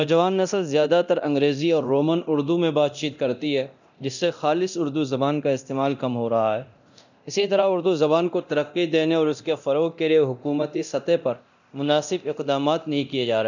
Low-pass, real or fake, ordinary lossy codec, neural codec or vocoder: 7.2 kHz; fake; none; codec, 24 kHz, 0.9 kbps, DualCodec